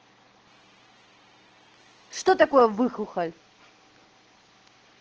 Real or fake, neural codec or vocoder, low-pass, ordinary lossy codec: real; none; 7.2 kHz; Opus, 16 kbps